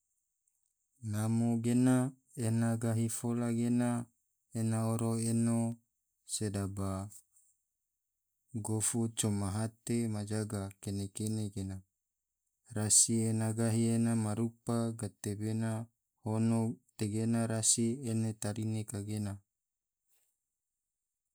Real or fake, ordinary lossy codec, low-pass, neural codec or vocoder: real; none; none; none